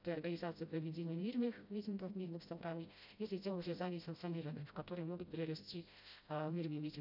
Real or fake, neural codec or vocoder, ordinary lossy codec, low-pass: fake; codec, 16 kHz, 0.5 kbps, FreqCodec, smaller model; none; 5.4 kHz